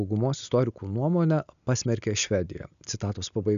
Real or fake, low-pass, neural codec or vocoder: real; 7.2 kHz; none